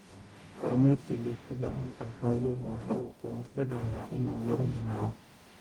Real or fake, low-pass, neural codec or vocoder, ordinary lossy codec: fake; 19.8 kHz; codec, 44.1 kHz, 0.9 kbps, DAC; Opus, 24 kbps